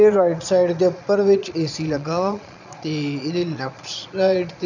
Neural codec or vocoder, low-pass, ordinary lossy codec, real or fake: vocoder, 22.05 kHz, 80 mel bands, Vocos; 7.2 kHz; none; fake